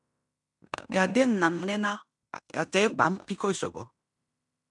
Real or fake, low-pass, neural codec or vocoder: fake; 10.8 kHz; codec, 16 kHz in and 24 kHz out, 0.9 kbps, LongCat-Audio-Codec, fine tuned four codebook decoder